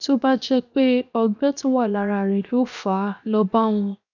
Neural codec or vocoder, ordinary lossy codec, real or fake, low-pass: codec, 16 kHz, 0.7 kbps, FocalCodec; none; fake; 7.2 kHz